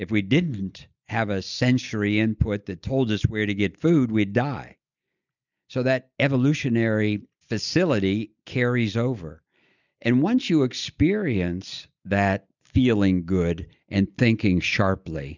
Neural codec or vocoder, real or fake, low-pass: none; real; 7.2 kHz